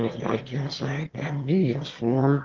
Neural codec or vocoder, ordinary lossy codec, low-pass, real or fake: autoencoder, 22.05 kHz, a latent of 192 numbers a frame, VITS, trained on one speaker; Opus, 16 kbps; 7.2 kHz; fake